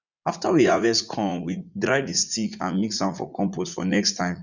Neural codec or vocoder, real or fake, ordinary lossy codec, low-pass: vocoder, 44.1 kHz, 80 mel bands, Vocos; fake; none; 7.2 kHz